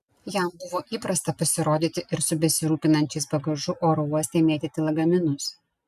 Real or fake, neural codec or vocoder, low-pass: real; none; 14.4 kHz